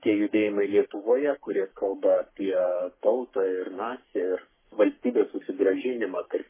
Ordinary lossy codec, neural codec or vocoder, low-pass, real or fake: MP3, 16 kbps; codec, 44.1 kHz, 3.4 kbps, Pupu-Codec; 3.6 kHz; fake